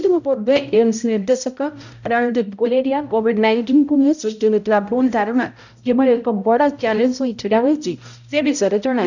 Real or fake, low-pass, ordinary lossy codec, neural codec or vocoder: fake; 7.2 kHz; none; codec, 16 kHz, 0.5 kbps, X-Codec, HuBERT features, trained on balanced general audio